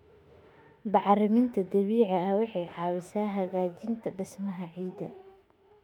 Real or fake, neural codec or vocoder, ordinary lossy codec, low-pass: fake; autoencoder, 48 kHz, 32 numbers a frame, DAC-VAE, trained on Japanese speech; MP3, 96 kbps; 19.8 kHz